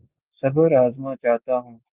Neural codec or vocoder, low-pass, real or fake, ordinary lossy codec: none; 3.6 kHz; real; Opus, 32 kbps